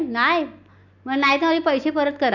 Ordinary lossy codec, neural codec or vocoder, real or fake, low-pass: none; none; real; 7.2 kHz